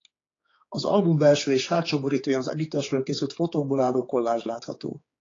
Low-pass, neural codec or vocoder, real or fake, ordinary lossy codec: 7.2 kHz; codec, 16 kHz, 4 kbps, X-Codec, HuBERT features, trained on general audio; fake; AAC, 32 kbps